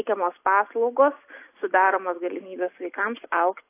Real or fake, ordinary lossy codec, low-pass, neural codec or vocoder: real; AAC, 24 kbps; 3.6 kHz; none